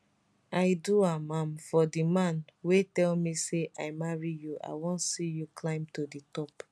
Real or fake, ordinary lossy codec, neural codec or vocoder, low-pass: real; none; none; none